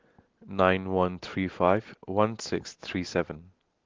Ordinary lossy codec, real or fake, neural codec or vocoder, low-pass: Opus, 16 kbps; real; none; 7.2 kHz